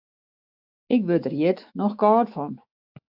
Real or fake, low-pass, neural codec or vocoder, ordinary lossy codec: fake; 5.4 kHz; autoencoder, 48 kHz, 128 numbers a frame, DAC-VAE, trained on Japanese speech; AAC, 48 kbps